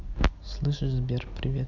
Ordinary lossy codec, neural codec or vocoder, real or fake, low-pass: none; none; real; 7.2 kHz